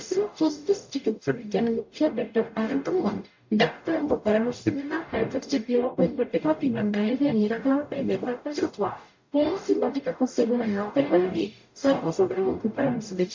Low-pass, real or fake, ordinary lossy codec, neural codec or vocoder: 7.2 kHz; fake; MP3, 48 kbps; codec, 44.1 kHz, 0.9 kbps, DAC